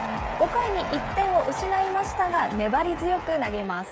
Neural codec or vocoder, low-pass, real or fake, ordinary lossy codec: codec, 16 kHz, 16 kbps, FreqCodec, smaller model; none; fake; none